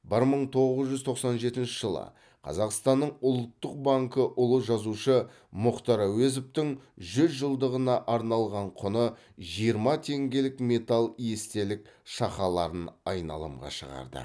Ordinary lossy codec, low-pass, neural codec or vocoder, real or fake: none; none; none; real